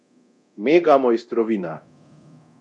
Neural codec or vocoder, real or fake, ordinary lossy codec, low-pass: codec, 24 kHz, 0.9 kbps, DualCodec; fake; none; 10.8 kHz